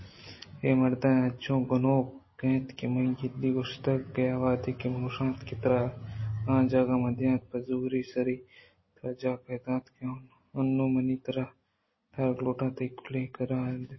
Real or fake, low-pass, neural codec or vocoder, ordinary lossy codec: real; 7.2 kHz; none; MP3, 24 kbps